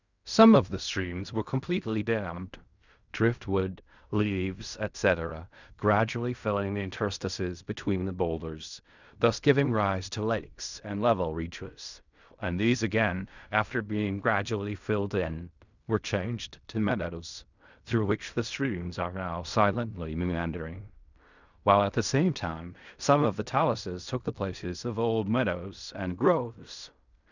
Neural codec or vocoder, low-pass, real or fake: codec, 16 kHz in and 24 kHz out, 0.4 kbps, LongCat-Audio-Codec, fine tuned four codebook decoder; 7.2 kHz; fake